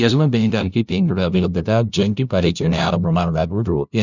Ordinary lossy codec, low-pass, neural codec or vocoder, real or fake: none; 7.2 kHz; codec, 16 kHz, 0.5 kbps, FunCodec, trained on LibriTTS, 25 frames a second; fake